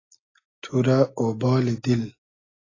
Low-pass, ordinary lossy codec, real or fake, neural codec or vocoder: 7.2 kHz; AAC, 32 kbps; real; none